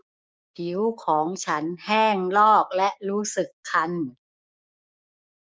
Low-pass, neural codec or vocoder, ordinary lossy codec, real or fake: none; codec, 16 kHz, 6 kbps, DAC; none; fake